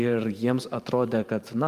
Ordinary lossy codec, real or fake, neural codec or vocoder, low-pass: Opus, 32 kbps; fake; vocoder, 44.1 kHz, 128 mel bands every 512 samples, BigVGAN v2; 14.4 kHz